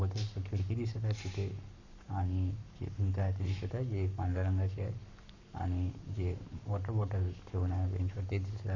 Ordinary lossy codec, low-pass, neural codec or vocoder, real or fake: none; 7.2 kHz; codec, 44.1 kHz, 7.8 kbps, Pupu-Codec; fake